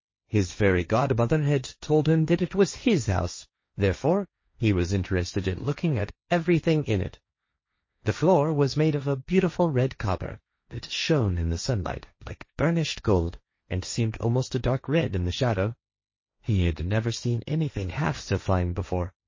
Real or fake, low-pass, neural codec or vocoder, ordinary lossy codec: fake; 7.2 kHz; codec, 16 kHz, 1.1 kbps, Voila-Tokenizer; MP3, 32 kbps